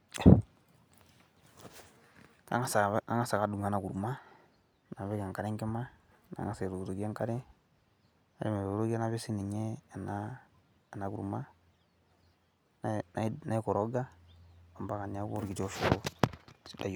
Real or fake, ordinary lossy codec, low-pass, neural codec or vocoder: real; none; none; none